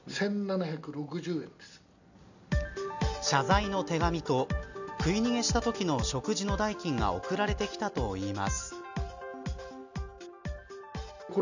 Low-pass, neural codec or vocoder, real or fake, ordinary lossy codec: 7.2 kHz; none; real; none